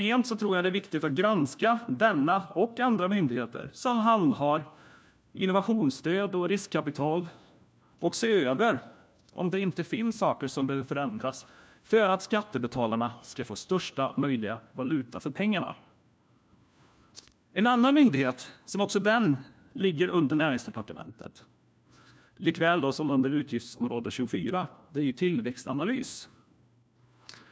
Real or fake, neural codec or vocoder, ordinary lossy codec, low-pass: fake; codec, 16 kHz, 1 kbps, FunCodec, trained on LibriTTS, 50 frames a second; none; none